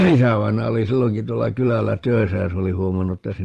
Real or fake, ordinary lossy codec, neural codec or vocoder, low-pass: fake; Opus, 24 kbps; vocoder, 44.1 kHz, 128 mel bands every 512 samples, BigVGAN v2; 14.4 kHz